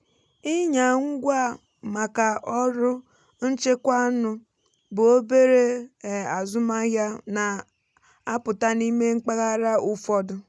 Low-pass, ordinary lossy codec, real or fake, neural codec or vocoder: none; none; real; none